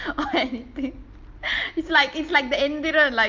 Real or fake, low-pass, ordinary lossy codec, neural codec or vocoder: real; 7.2 kHz; Opus, 24 kbps; none